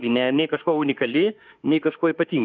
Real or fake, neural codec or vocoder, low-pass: fake; autoencoder, 48 kHz, 32 numbers a frame, DAC-VAE, trained on Japanese speech; 7.2 kHz